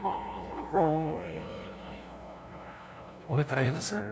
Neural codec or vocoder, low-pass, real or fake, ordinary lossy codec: codec, 16 kHz, 0.5 kbps, FunCodec, trained on LibriTTS, 25 frames a second; none; fake; none